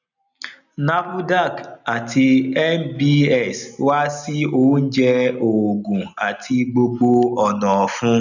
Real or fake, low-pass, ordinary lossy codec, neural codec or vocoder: real; 7.2 kHz; none; none